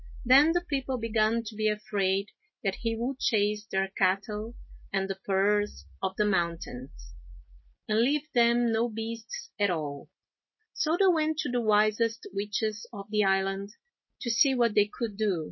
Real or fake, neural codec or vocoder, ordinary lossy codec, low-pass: real; none; MP3, 24 kbps; 7.2 kHz